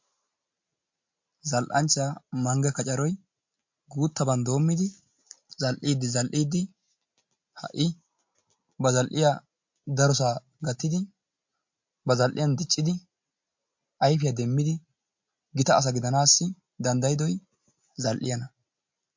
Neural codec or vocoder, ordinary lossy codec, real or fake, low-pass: none; MP3, 48 kbps; real; 7.2 kHz